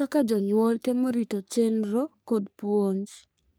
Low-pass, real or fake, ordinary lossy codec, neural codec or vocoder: none; fake; none; codec, 44.1 kHz, 3.4 kbps, Pupu-Codec